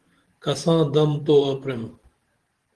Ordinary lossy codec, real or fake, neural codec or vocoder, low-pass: Opus, 16 kbps; fake; vocoder, 44.1 kHz, 128 mel bands every 512 samples, BigVGAN v2; 10.8 kHz